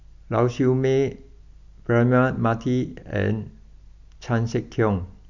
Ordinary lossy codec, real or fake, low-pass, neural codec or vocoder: none; real; 7.2 kHz; none